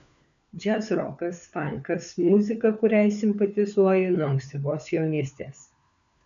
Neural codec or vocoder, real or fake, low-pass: codec, 16 kHz, 4 kbps, FunCodec, trained on LibriTTS, 50 frames a second; fake; 7.2 kHz